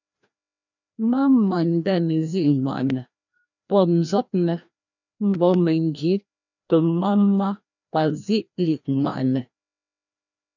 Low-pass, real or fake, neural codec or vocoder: 7.2 kHz; fake; codec, 16 kHz, 1 kbps, FreqCodec, larger model